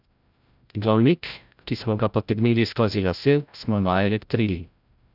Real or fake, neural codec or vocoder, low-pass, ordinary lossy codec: fake; codec, 16 kHz, 0.5 kbps, FreqCodec, larger model; 5.4 kHz; none